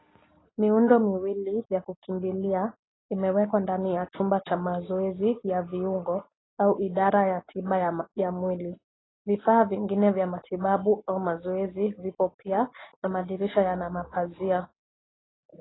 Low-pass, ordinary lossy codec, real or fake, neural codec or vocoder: 7.2 kHz; AAC, 16 kbps; real; none